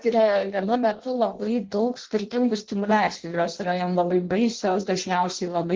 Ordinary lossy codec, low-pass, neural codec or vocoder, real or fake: Opus, 16 kbps; 7.2 kHz; codec, 16 kHz in and 24 kHz out, 0.6 kbps, FireRedTTS-2 codec; fake